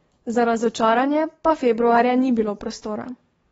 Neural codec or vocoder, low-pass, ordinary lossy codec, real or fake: codec, 44.1 kHz, 7.8 kbps, DAC; 19.8 kHz; AAC, 24 kbps; fake